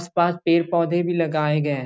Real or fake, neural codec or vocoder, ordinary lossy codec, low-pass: real; none; none; none